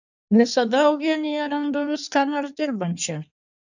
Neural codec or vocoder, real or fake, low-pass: codec, 16 kHz in and 24 kHz out, 1.1 kbps, FireRedTTS-2 codec; fake; 7.2 kHz